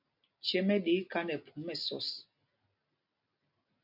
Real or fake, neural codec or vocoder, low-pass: real; none; 5.4 kHz